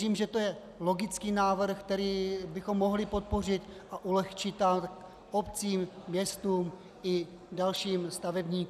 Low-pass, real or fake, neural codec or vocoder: 14.4 kHz; real; none